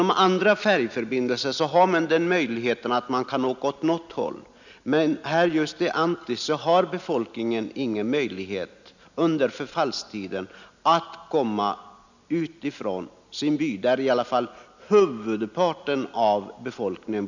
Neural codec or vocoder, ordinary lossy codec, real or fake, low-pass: none; none; real; 7.2 kHz